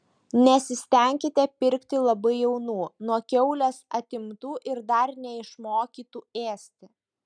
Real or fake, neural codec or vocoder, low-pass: real; none; 9.9 kHz